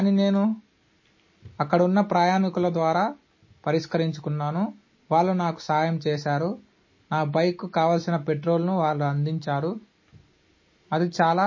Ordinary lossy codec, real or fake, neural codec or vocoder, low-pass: MP3, 32 kbps; real; none; 7.2 kHz